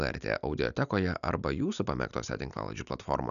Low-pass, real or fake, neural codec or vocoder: 7.2 kHz; real; none